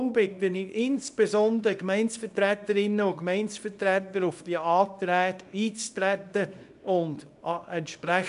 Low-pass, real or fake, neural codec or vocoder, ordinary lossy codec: 10.8 kHz; fake; codec, 24 kHz, 0.9 kbps, WavTokenizer, small release; none